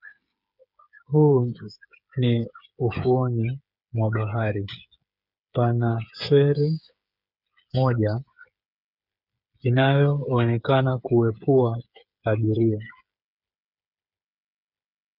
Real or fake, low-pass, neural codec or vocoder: fake; 5.4 kHz; codec, 16 kHz, 8 kbps, FreqCodec, smaller model